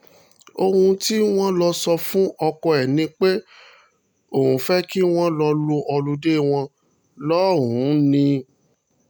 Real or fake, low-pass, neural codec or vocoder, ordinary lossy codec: real; none; none; none